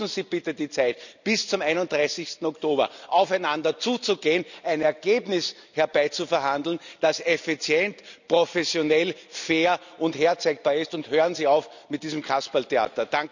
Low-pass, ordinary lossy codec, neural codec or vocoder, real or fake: 7.2 kHz; none; none; real